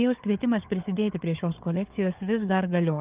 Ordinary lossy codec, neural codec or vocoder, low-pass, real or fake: Opus, 32 kbps; codec, 16 kHz, 4 kbps, FunCodec, trained on Chinese and English, 50 frames a second; 3.6 kHz; fake